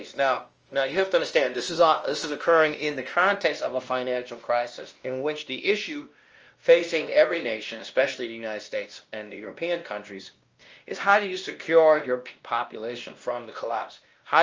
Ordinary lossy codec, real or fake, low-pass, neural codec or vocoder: Opus, 24 kbps; fake; 7.2 kHz; codec, 16 kHz, 1 kbps, X-Codec, WavLM features, trained on Multilingual LibriSpeech